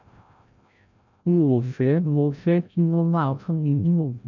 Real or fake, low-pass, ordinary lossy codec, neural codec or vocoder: fake; 7.2 kHz; none; codec, 16 kHz, 0.5 kbps, FreqCodec, larger model